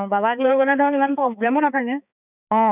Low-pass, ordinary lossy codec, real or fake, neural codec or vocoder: 3.6 kHz; none; fake; codec, 16 kHz, 4 kbps, X-Codec, HuBERT features, trained on balanced general audio